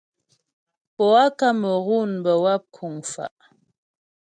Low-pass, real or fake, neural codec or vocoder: 9.9 kHz; real; none